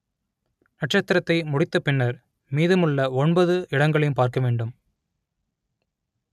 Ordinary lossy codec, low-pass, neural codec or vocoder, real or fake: none; 14.4 kHz; none; real